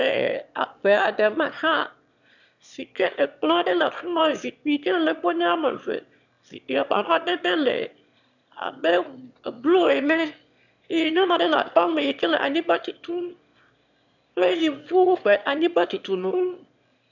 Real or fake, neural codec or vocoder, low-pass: fake; autoencoder, 22.05 kHz, a latent of 192 numbers a frame, VITS, trained on one speaker; 7.2 kHz